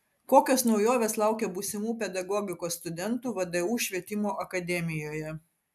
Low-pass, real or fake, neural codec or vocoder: 14.4 kHz; real; none